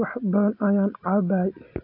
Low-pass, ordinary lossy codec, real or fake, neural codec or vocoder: 5.4 kHz; AAC, 32 kbps; real; none